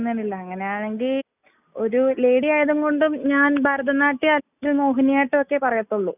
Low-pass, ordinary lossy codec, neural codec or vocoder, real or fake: 3.6 kHz; none; none; real